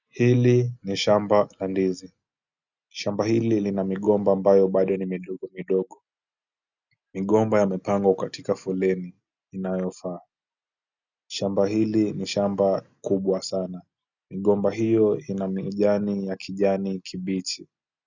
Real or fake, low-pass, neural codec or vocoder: real; 7.2 kHz; none